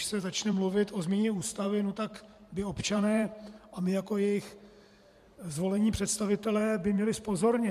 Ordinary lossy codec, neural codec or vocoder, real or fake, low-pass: MP3, 64 kbps; vocoder, 44.1 kHz, 128 mel bands every 512 samples, BigVGAN v2; fake; 14.4 kHz